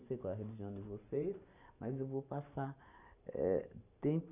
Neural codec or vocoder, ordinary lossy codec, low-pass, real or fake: vocoder, 44.1 kHz, 128 mel bands every 512 samples, BigVGAN v2; none; 3.6 kHz; fake